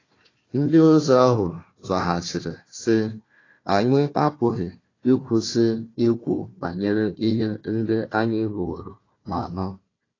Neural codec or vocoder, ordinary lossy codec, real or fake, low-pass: codec, 16 kHz, 1 kbps, FunCodec, trained on Chinese and English, 50 frames a second; AAC, 32 kbps; fake; 7.2 kHz